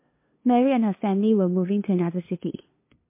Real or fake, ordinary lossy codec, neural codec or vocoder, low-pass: fake; MP3, 24 kbps; codec, 16 kHz, 2 kbps, FunCodec, trained on LibriTTS, 25 frames a second; 3.6 kHz